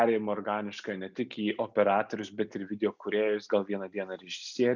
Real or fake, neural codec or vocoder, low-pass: real; none; 7.2 kHz